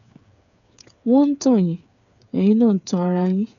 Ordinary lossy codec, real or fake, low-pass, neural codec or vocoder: none; fake; 7.2 kHz; codec, 16 kHz, 8 kbps, FreqCodec, smaller model